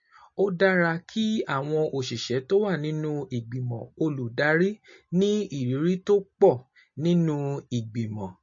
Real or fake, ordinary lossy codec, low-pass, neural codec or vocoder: real; MP3, 32 kbps; 7.2 kHz; none